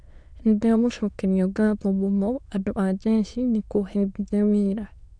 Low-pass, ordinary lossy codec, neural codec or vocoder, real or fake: 9.9 kHz; none; autoencoder, 22.05 kHz, a latent of 192 numbers a frame, VITS, trained on many speakers; fake